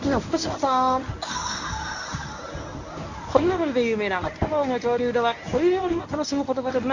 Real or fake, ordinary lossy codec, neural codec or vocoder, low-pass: fake; none; codec, 24 kHz, 0.9 kbps, WavTokenizer, medium speech release version 1; 7.2 kHz